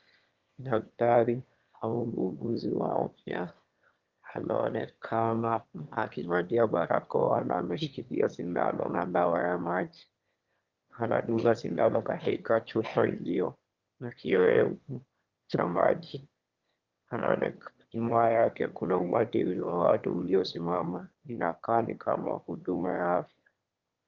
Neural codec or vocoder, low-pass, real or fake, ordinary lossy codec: autoencoder, 22.05 kHz, a latent of 192 numbers a frame, VITS, trained on one speaker; 7.2 kHz; fake; Opus, 32 kbps